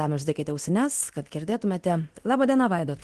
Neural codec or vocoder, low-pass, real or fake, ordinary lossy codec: codec, 24 kHz, 0.9 kbps, DualCodec; 10.8 kHz; fake; Opus, 16 kbps